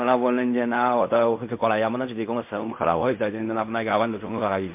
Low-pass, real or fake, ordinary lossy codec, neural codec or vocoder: 3.6 kHz; fake; MP3, 32 kbps; codec, 16 kHz in and 24 kHz out, 0.4 kbps, LongCat-Audio-Codec, fine tuned four codebook decoder